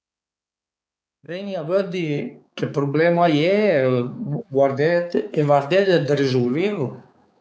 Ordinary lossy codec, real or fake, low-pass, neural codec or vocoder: none; fake; none; codec, 16 kHz, 4 kbps, X-Codec, HuBERT features, trained on balanced general audio